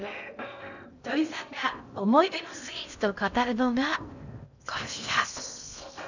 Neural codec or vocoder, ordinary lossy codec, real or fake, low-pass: codec, 16 kHz in and 24 kHz out, 0.8 kbps, FocalCodec, streaming, 65536 codes; none; fake; 7.2 kHz